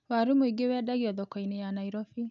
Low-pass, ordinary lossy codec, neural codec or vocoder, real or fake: 7.2 kHz; none; none; real